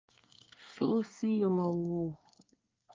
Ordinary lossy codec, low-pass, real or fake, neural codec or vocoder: Opus, 24 kbps; 7.2 kHz; fake; codec, 24 kHz, 1 kbps, SNAC